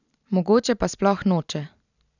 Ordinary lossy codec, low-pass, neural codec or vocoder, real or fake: none; 7.2 kHz; none; real